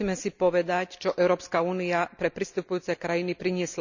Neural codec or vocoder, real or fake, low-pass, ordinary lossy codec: none; real; 7.2 kHz; none